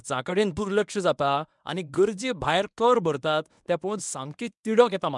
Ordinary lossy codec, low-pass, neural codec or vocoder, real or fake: none; 10.8 kHz; codec, 24 kHz, 0.9 kbps, WavTokenizer, medium speech release version 1; fake